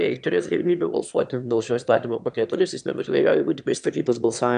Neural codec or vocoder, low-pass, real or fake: autoencoder, 22.05 kHz, a latent of 192 numbers a frame, VITS, trained on one speaker; 9.9 kHz; fake